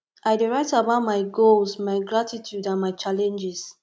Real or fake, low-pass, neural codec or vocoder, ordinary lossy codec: real; none; none; none